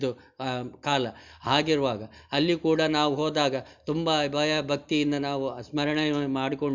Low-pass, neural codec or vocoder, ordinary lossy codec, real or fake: 7.2 kHz; none; none; real